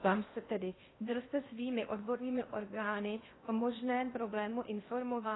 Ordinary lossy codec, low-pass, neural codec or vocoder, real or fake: AAC, 16 kbps; 7.2 kHz; codec, 16 kHz in and 24 kHz out, 0.8 kbps, FocalCodec, streaming, 65536 codes; fake